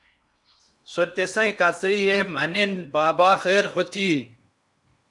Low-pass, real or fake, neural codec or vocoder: 10.8 kHz; fake; codec, 16 kHz in and 24 kHz out, 0.8 kbps, FocalCodec, streaming, 65536 codes